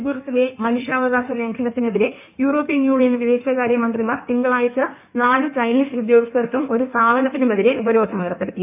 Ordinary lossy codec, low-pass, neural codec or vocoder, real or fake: none; 3.6 kHz; codec, 16 kHz in and 24 kHz out, 1.1 kbps, FireRedTTS-2 codec; fake